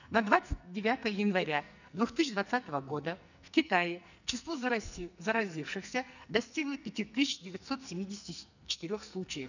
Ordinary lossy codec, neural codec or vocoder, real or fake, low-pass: none; codec, 44.1 kHz, 2.6 kbps, SNAC; fake; 7.2 kHz